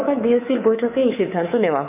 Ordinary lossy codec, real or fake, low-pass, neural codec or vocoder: none; fake; 3.6 kHz; codec, 24 kHz, 3.1 kbps, DualCodec